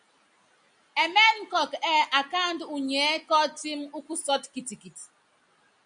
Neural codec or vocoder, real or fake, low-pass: none; real; 9.9 kHz